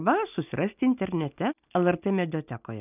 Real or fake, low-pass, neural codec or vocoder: real; 3.6 kHz; none